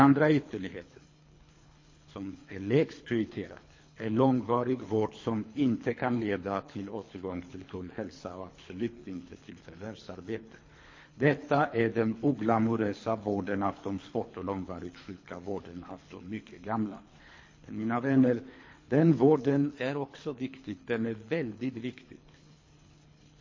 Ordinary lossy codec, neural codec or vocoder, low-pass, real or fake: MP3, 32 kbps; codec, 24 kHz, 3 kbps, HILCodec; 7.2 kHz; fake